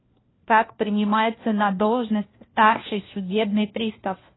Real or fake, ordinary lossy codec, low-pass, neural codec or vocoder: fake; AAC, 16 kbps; 7.2 kHz; codec, 16 kHz, 1 kbps, FunCodec, trained on LibriTTS, 50 frames a second